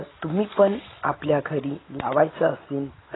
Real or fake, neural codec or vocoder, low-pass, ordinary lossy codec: real; none; 7.2 kHz; AAC, 16 kbps